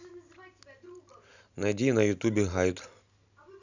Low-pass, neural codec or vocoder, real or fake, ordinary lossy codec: 7.2 kHz; none; real; none